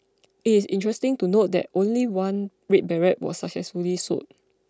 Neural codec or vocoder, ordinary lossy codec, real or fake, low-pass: none; none; real; none